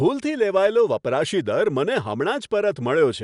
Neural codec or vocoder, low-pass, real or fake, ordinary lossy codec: none; 10.8 kHz; real; none